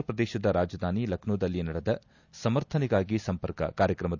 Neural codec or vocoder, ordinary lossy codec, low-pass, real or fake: none; none; 7.2 kHz; real